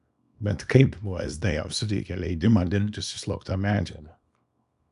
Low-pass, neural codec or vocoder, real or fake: 10.8 kHz; codec, 24 kHz, 0.9 kbps, WavTokenizer, small release; fake